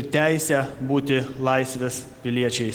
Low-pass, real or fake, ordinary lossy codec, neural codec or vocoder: 19.8 kHz; real; Opus, 16 kbps; none